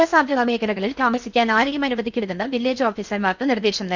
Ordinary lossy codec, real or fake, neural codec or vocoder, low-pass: none; fake; codec, 16 kHz in and 24 kHz out, 0.8 kbps, FocalCodec, streaming, 65536 codes; 7.2 kHz